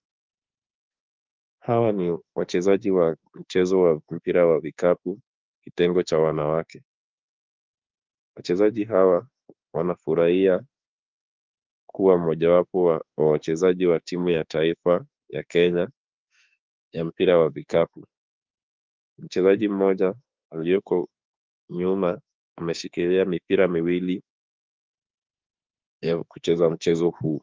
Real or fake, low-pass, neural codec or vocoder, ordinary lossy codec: fake; 7.2 kHz; autoencoder, 48 kHz, 32 numbers a frame, DAC-VAE, trained on Japanese speech; Opus, 32 kbps